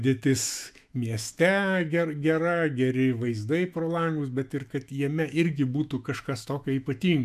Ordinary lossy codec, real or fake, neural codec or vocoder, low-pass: MP3, 96 kbps; fake; autoencoder, 48 kHz, 128 numbers a frame, DAC-VAE, trained on Japanese speech; 14.4 kHz